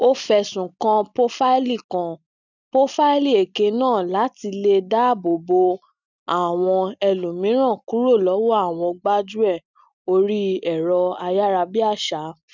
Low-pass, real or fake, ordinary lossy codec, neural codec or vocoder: 7.2 kHz; real; none; none